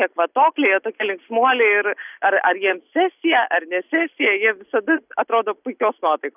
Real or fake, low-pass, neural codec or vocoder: real; 3.6 kHz; none